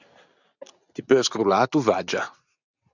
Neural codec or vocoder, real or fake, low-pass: none; real; 7.2 kHz